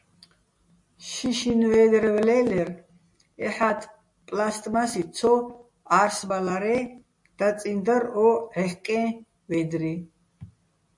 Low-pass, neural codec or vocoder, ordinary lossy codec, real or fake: 10.8 kHz; none; MP3, 48 kbps; real